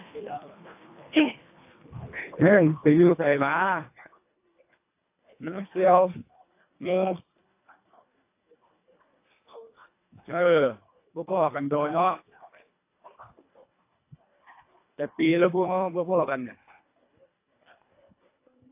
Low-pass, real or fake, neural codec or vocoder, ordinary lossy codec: 3.6 kHz; fake; codec, 24 kHz, 1.5 kbps, HILCodec; AAC, 24 kbps